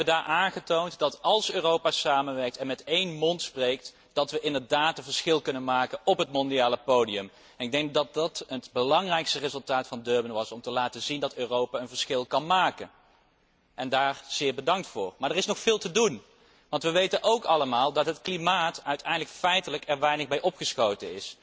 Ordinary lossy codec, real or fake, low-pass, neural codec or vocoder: none; real; none; none